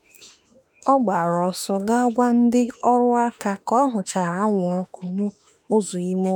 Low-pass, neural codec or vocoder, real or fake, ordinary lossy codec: none; autoencoder, 48 kHz, 32 numbers a frame, DAC-VAE, trained on Japanese speech; fake; none